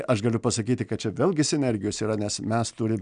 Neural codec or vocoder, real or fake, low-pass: none; real; 9.9 kHz